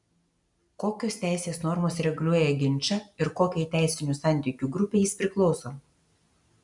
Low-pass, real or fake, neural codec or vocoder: 10.8 kHz; real; none